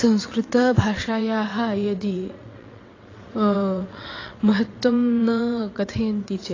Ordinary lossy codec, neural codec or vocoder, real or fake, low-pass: AAC, 32 kbps; vocoder, 22.05 kHz, 80 mel bands, WaveNeXt; fake; 7.2 kHz